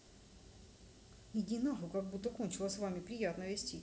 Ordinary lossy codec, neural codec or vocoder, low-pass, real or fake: none; none; none; real